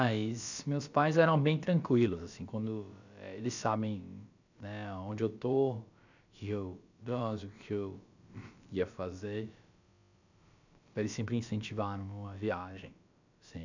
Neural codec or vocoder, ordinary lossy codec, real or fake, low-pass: codec, 16 kHz, about 1 kbps, DyCAST, with the encoder's durations; none; fake; 7.2 kHz